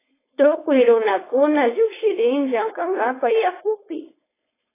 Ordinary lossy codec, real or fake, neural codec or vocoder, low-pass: AAC, 16 kbps; fake; codec, 16 kHz, 4.8 kbps, FACodec; 3.6 kHz